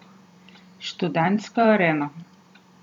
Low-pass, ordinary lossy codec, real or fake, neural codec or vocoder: 19.8 kHz; none; fake; vocoder, 44.1 kHz, 128 mel bands every 256 samples, BigVGAN v2